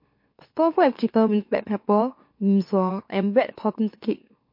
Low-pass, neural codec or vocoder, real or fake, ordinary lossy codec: 5.4 kHz; autoencoder, 44.1 kHz, a latent of 192 numbers a frame, MeloTTS; fake; MP3, 32 kbps